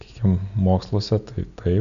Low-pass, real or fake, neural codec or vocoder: 7.2 kHz; real; none